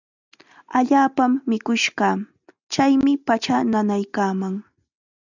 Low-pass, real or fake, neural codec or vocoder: 7.2 kHz; real; none